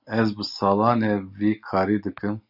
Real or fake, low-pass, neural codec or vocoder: real; 5.4 kHz; none